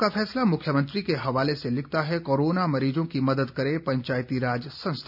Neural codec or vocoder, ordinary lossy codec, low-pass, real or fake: none; none; 5.4 kHz; real